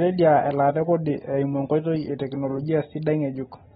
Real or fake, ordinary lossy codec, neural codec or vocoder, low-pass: real; AAC, 16 kbps; none; 19.8 kHz